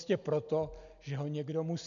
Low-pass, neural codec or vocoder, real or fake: 7.2 kHz; none; real